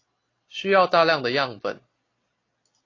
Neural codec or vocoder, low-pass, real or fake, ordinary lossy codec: none; 7.2 kHz; real; AAC, 32 kbps